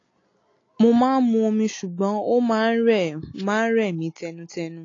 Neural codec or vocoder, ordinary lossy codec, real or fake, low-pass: none; AAC, 32 kbps; real; 7.2 kHz